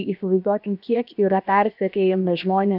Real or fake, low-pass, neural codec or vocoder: fake; 5.4 kHz; codec, 16 kHz, about 1 kbps, DyCAST, with the encoder's durations